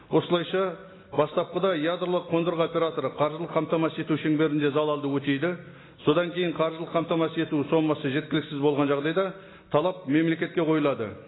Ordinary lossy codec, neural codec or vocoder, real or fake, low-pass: AAC, 16 kbps; none; real; 7.2 kHz